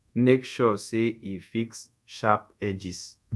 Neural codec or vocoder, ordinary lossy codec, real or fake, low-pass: codec, 24 kHz, 0.5 kbps, DualCodec; none; fake; none